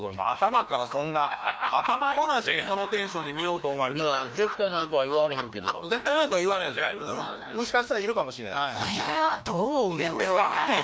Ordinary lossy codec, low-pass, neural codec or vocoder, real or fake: none; none; codec, 16 kHz, 1 kbps, FreqCodec, larger model; fake